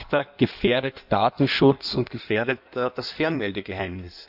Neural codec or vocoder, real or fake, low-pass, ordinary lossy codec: codec, 16 kHz in and 24 kHz out, 1.1 kbps, FireRedTTS-2 codec; fake; 5.4 kHz; none